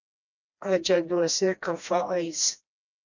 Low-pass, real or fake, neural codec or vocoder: 7.2 kHz; fake; codec, 16 kHz, 1 kbps, FreqCodec, smaller model